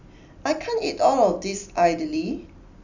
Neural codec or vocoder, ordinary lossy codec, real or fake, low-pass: none; none; real; 7.2 kHz